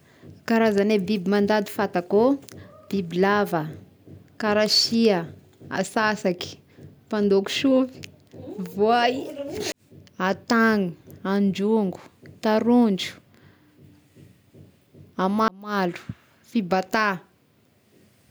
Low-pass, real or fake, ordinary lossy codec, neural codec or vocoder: none; real; none; none